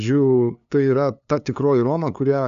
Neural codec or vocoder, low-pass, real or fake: codec, 16 kHz, 2 kbps, FunCodec, trained on LibriTTS, 25 frames a second; 7.2 kHz; fake